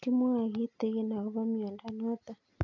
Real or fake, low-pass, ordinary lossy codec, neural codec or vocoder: real; 7.2 kHz; none; none